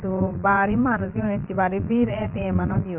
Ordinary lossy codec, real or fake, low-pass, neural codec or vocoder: Opus, 24 kbps; fake; 3.6 kHz; vocoder, 22.05 kHz, 80 mel bands, Vocos